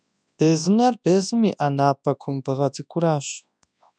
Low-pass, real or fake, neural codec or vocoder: 9.9 kHz; fake; codec, 24 kHz, 0.9 kbps, WavTokenizer, large speech release